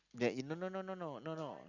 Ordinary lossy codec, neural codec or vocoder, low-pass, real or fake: Opus, 64 kbps; none; 7.2 kHz; real